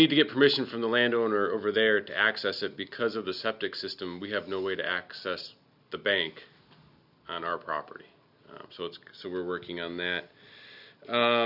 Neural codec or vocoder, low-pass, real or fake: none; 5.4 kHz; real